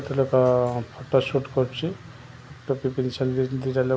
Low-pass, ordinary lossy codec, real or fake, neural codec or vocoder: none; none; real; none